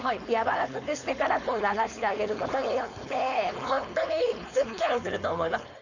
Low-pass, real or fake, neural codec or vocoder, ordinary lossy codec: 7.2 kHz; fake; codec, 16 kHz, 4.8 kbps, FACodec; none